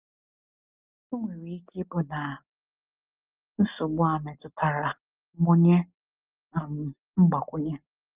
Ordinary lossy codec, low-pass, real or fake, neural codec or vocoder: Opus, 24 kbps; 3.6 kHz; real; none